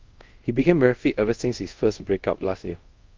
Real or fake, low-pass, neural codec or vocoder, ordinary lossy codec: fake; 7.2 kHz; codec, 24 kHz, 0.5 kbps, DualCodec; Opus, 24 kbps